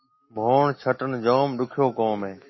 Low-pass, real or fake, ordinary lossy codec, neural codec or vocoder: 7.2 kHz; fake; MP3, 24 kbps; autoencoder, 48 kHz, 128 numbers a frame, DAC-VAE, trained on Japanese speech